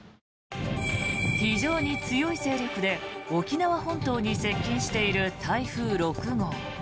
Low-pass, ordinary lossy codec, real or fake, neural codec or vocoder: none; none; real; none